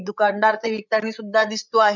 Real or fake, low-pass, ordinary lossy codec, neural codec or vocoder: fake; 7.2 kHz; none; codec, 16 kHz, 8 kbps, FreqCodec, larger model